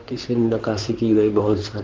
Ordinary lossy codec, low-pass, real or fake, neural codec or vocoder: Opus, 16 kbps; 7.2 kHz; fake; codec, 16 kHz in and 24 kHz out, 2.2 kbps, FireRedTTS-2 codec